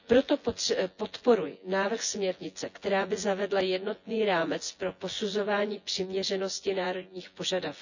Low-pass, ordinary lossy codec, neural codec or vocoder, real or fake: 7.2 kHz; none; vocoder, 24 kHz, 100 mel bands, Vocos; fake